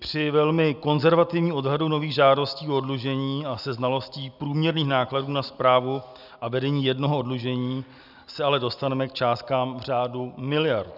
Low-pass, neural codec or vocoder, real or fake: 5.4 kHz; none; real